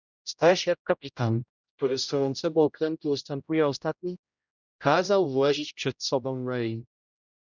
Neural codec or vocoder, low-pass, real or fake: codec, 16 kHz, 0.5 kbps, X-Codec, HuBERT features, trained on general audio; 7.2 kHz; fake